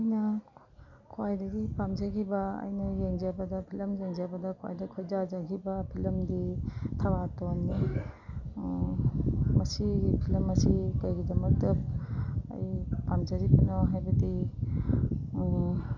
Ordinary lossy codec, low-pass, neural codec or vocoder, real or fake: none; 7.2 kHz; none; real